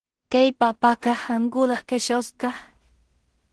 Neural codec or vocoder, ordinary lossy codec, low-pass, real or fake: codec, 16 kHz in and 24 kHz out, 0.4 kbps, LongCat-Audio-Codec, two codebook decoder; Opus, 16 kbps; 10.8 kHz; fake